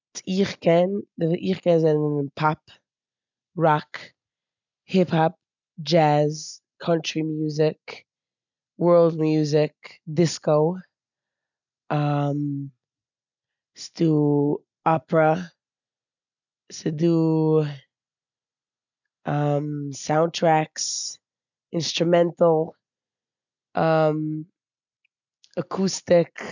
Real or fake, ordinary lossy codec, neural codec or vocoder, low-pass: real; none; none; 7.2 kHz